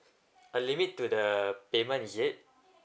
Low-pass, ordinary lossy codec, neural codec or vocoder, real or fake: none; none; none; real